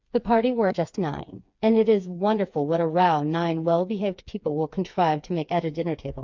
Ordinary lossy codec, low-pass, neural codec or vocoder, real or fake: MP3, 64 kbps; 7.2 kHz; codec, 16 kHz, 4 kbps, FreqCodec, smaller model; fake